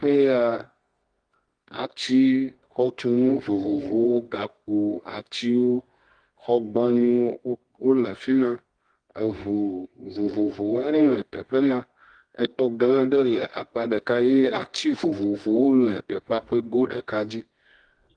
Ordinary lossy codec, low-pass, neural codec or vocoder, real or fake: Opus, 24 kbps; 9.9 kHz; codec, 24 kHz, 0.9 kbps, WavTokenizer, medium music audio release; fake